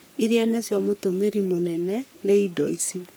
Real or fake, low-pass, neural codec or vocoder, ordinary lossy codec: fake; none; codec, 44.1 kHz, 3.4 kbps, Pupu-Codec; none